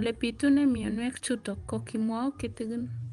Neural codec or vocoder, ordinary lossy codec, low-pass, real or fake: vocoder, 24 kHz, 100 mel bands, Vocos; Opus, 32 kbps; 10.8 kHz; fake